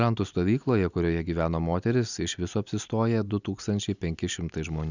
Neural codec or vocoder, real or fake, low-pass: none; real; 7.2 kHz